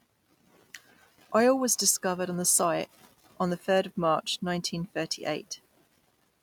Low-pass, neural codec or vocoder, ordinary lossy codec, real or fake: 19.8 kHz; none; none; real